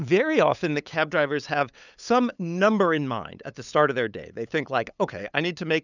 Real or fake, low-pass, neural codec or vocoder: fake; 7.2 kHz; codec, 16 kHz, 8 kbps, FunCodec, trained on LibriTTS, 25 frames a second